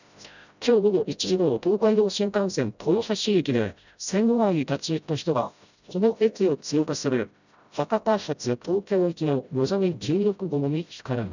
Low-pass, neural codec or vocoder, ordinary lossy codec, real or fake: 7.2 kHz; codec, 16 kHz, 0.5 kbps, FreqCodec, smaller model; none; fake